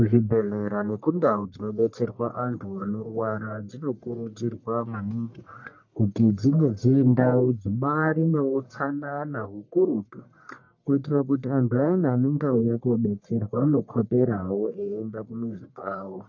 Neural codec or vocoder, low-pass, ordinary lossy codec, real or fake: codec, 44.1 kHz, 1.7 kbps, Pupu-Codec; 7.2 kHz; MP3, 48 kbps; fake